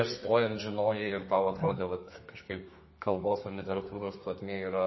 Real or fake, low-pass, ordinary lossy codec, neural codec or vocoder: fake; 7.2 kHz; MP3, 24 kbps; codec, 32 kHz, 1.9 kbps, SNAC